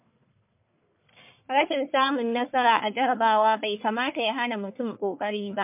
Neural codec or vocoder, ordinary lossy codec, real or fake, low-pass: codec, 44.1 kHz, 1.7 kbps, Pupu-Codec; MP3, 32 kbps; fake; 3.6 kHz